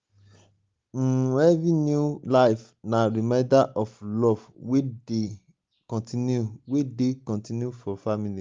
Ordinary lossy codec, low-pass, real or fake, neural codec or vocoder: Opus, 32 kbps; 7.2 kHz; real; none